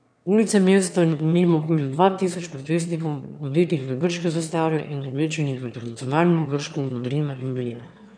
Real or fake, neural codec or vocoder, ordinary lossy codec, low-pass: fake; autoencoder, 22.05 kHz, a latent of 192 numbers a frame, VITS, trained on one speaker; none; 9.9 kHz